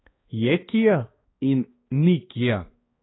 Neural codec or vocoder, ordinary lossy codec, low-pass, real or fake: codec, 16 kHz, 2 kbps, X-Codec, HuBERT features, trained on balanced general audio; AAC, 16 kbps; 7.2 kHz; fake